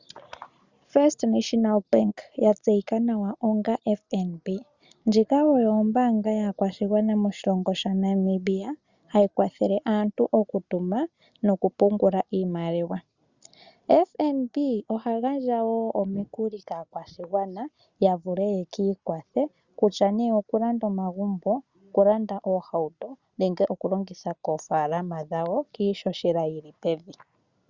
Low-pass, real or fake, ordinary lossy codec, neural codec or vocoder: 7.2 kHz; real; Opus, 64 kbps; none